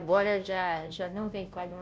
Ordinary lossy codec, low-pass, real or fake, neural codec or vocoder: none; none; fake; codec, 16 kHz, 0.5 kbps, FunCodec, trained on Chinese and English, 25 frames a second